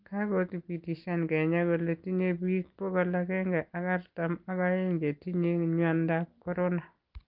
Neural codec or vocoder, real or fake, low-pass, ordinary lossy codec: none; real; 5.4 kHz; Opus, 32 kbps